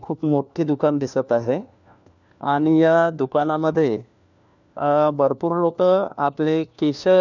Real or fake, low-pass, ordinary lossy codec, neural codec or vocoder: fake; 7.2 kHz; none; codec, 16 kHz, 1 kbps, FunCodec, trained on LibriTTS, 50 frames a second